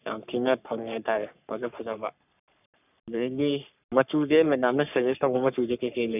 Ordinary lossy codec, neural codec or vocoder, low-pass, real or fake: none; codec, 44.1 kHz, 3.4 kbps, Pupu-Codec; 3.6 kHz; fake